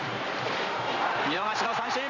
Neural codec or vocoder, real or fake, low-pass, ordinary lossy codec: vocoder, 44.1 kHz, 128 mel bands every 512 samples, BigVGAN v2; fake; 7.2 kHz; none